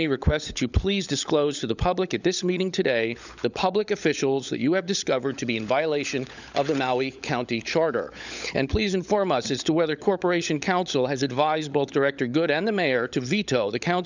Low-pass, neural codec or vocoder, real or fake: 7.2 kHz; codec, 16 kHz, 16 kbps, FunCodec, trained on LibriTTS, 50 frames a second; fake